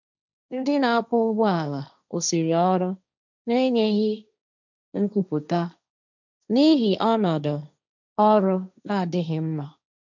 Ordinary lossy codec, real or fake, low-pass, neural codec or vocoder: none; fake; 7.2 kHz; codec, 16 kHz, 1.1 kbps, Voila-Tokenizer